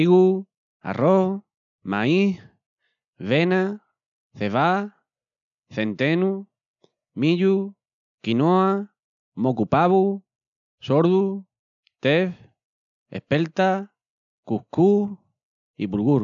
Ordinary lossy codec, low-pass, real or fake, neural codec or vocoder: none; 7.2 kHz; real; none